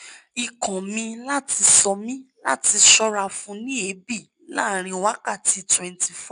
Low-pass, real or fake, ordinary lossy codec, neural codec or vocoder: 9.9 kHz; real; none; none